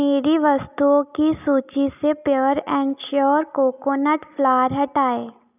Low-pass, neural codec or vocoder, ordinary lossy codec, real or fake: 3.6 kHz; none; none; real